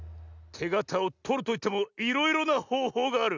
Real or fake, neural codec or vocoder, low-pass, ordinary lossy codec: real; none; 7.2 kHz; none